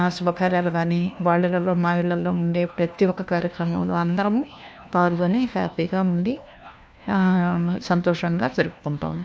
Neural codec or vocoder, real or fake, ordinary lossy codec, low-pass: codec, 16 kHz, 1 kbps, FunCodec, trained on LibriTTS, 50 frames a second; fake; none; none